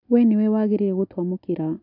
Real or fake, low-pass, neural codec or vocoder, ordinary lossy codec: real; 5.4 kHz; none; none